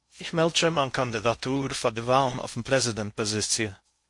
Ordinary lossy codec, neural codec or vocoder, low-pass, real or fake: MP3, 48 kbps; codec, 16 kHz in and 24 kHz out, 0.6 kbps, FocalCodec, streaming, 2048 codes; 10.8 kHz; fake